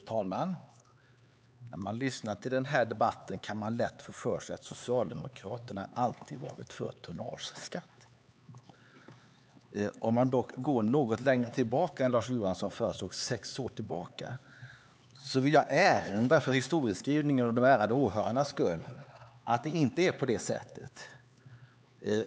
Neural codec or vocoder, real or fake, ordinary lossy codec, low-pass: codec, 16 kHz, 4 kbps, X-Codec, HuBERT features, trained on LibriSpeech; fake; none; none